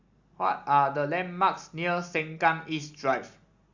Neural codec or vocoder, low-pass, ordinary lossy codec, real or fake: none; 7.2 kHz; Opus, 64 kbps; real